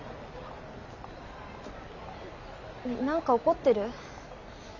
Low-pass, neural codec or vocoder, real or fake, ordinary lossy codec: 7.2 kHz; none; real; none